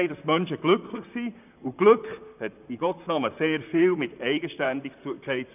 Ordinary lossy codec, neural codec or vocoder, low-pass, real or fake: none; vocoder, 44.1 kHz, 128 mel bands, Pupu-Vocoder; 3.6 kHz; fake